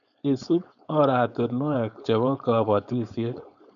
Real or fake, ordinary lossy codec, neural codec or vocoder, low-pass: fake; none; codec, 16 kHz, 4.8 kbps, FACodec; 7.2 kHz